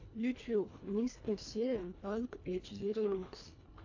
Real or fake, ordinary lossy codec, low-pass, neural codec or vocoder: fake; AAC, 48 kbps; 7.2 kHz; codec, 24 kHz, 1.5 kbps, HILCodec